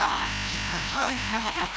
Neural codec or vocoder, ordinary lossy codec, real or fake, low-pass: codec, 16 kHz, 0.5 kbps, FreqCodec, larger model; none; fake; none